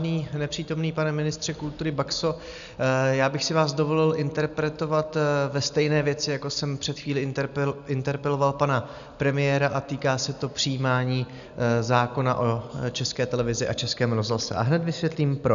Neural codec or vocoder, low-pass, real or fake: none; 7.2 kHz; real